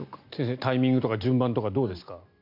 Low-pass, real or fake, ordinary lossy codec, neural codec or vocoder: 5.4 kHz; real; MP3, 48 kbps; none